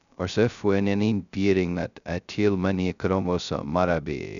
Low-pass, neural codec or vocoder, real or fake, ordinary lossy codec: 7.2 kHz; codec, 16 kHz, 0.2 kbps, FocalCodec; fake; none